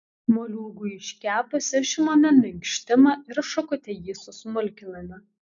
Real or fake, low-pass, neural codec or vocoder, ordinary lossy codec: real; 7.2 kHz; none; MP3, 64 kbps